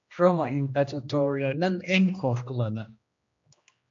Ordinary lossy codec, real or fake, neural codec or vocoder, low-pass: MP3, 48 kbps; fake; codec, 16 kHz, 1 kbps, X-Codec, HuBERT features, trained on general audio; 7.2 kHz